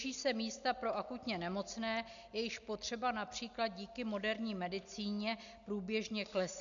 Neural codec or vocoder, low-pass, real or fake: none; 7.2 kHz; real